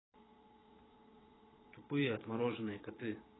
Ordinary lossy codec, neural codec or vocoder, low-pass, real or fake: AAC, 16 kbps; vocoder, 22.05 kHz, 80 mel bands, Vocos; 7.2 kHz; fake